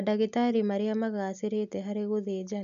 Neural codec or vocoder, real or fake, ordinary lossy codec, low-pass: none; real; none; 7.2 kHz